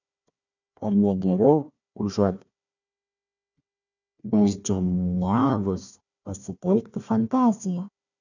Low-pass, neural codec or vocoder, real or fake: 7.2 kHz; codec, 16 kHz, 1 kbps, FunCodec, trained on Chinese and English, 50 frames a second; fake